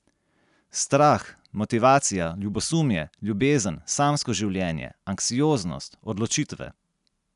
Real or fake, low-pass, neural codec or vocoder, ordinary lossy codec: real; 10.8 kHz; none; none